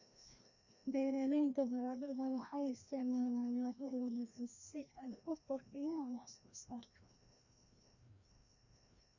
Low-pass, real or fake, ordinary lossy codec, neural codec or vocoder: 7.2 kHz; fake; none; codec, 16 kHz, 1 kbps, FreqCodec, larger model